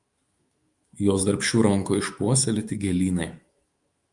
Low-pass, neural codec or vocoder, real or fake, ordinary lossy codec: 10.8 kHz; autoencoder, 48 kHz, 128 numbers a frame, DAC-VAE, trained on Japanese speech; fake; Opus, 32 kbps